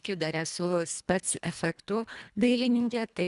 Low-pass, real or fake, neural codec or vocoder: 10.8 kHz; fake; codec, 24 kHz, 1.5 kbps, HILCodec